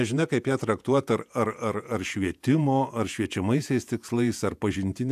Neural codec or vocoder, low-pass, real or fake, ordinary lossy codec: vocoder, 48 kHz, 128 mel bands, Vocos; 14.4 kHz; fake; AAC, 96 kbps